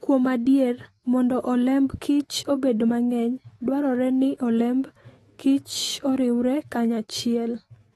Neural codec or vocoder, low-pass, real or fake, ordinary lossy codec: autoencoder, 48 kHz, 128 numbers a frame, DAC-VAE, trained on Japanese speech; 19.8 kHz; fake; AAC, 32 kbps